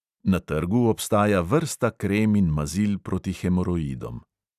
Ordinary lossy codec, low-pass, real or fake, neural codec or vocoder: none; 14.4 kHz; real; none